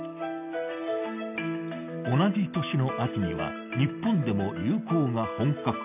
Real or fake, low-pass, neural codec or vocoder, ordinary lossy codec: real; 3.6 kHz; none; AAC, 32 kbps